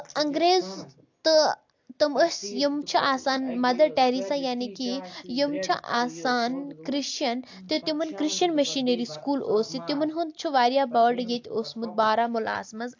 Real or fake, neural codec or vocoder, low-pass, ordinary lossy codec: real; none; 7.2 kHz; AAC, 48 kbps